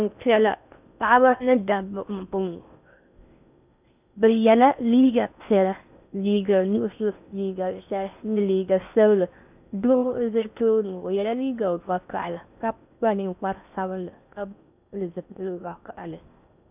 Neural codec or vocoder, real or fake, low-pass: codec, 16 kHz in and 24 kHz out, 0.6 kbps, FocalCodec, streaming, 4096 codes; fake; 3.6 kHz